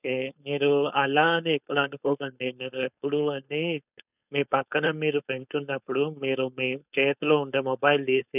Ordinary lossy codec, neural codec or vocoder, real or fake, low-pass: none; codec, 16 kHz, 4.8 kbps, FACodec; fake; 3.6 kHz